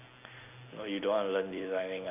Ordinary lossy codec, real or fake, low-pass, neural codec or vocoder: none; real; 3.6 kHz; none